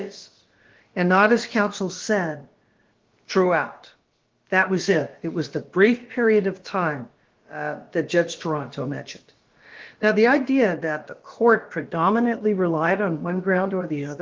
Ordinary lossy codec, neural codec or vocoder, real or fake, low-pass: Opus, 16 kbps; codec, 16 kHz, about 1 kbps, DyCAST, with the encoder's durations; fake; 7.2 kHz